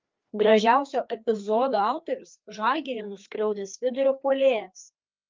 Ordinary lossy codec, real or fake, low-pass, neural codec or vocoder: Opus, 24 kbps; fake; 7.2 kHz; codec, 16 kHz, 2 kbps, FreqCodec, larger model